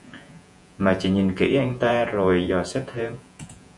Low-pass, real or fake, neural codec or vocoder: 10.8 kHz; fake; vocoder, 48 kHz, 128 mel bands, Vocos